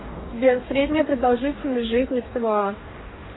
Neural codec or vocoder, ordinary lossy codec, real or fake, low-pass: codec, 44.1 kHz, 2.6 kbps, DAC; AAC, 16 kbps; fake; 7.2 kHz